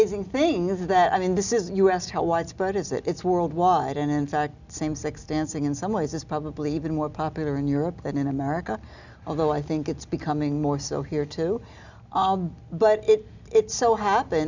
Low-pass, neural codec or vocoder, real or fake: 7.2 kHz; none; real